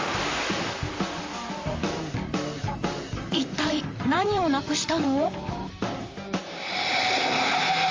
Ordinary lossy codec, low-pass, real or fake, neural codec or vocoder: Opus, 32 kbps; 7.2 kHz; fake; vocoder, 44.1 kHz, 128 mel bands, Pupu-Vocoder